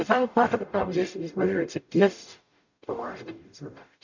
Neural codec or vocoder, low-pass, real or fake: codec, 44.1 kHz, 0.9 kbps, DAC; 7.2 kHz; fake